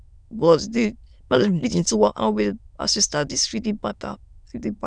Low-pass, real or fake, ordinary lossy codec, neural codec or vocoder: 9.9 kHz; fake; none; autoencoder, 22.05 kHz, a latent of 192 numbers a frame, VITS, trained on many speakers